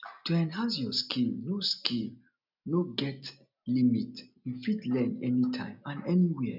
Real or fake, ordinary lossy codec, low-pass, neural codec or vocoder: real; none; 5.4 kHz; none